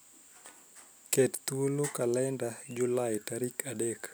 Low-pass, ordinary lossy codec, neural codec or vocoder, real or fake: none; none; none; real